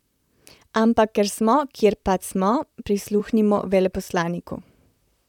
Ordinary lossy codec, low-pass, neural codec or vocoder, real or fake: none; 19.8 kHz; vocoder, 44.1 kHz, 128 mel bands, Pupu-Vocoder; fake